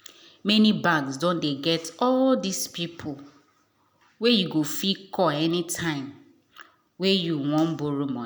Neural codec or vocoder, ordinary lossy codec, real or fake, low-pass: none; none; real; none